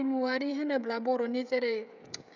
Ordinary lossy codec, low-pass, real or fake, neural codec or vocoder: none; 7.2 kHz; fake; codec, 16 kHz, 16 kbps, FreqCodec, smaller model